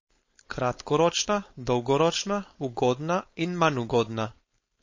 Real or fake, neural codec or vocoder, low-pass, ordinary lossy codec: fake; codec, 16 kHz, 4.8 kbps, FACodec; 7.2 kHz; MP3, 32 kbps